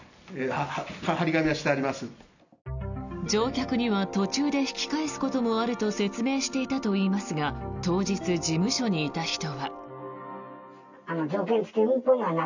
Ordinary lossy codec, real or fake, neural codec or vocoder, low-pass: none; real; none; 7.2 kHz